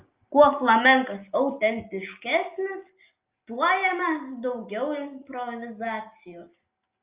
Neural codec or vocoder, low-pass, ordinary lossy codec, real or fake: none; 3.6 kHz; Opus, 24 kbps; real